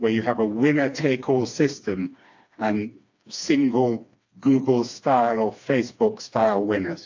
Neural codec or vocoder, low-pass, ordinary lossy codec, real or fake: codec, 16 kHz, 2 kbps, FreqCodec, smaller model; 7.2 kHz; AAC, 48 kbps; fake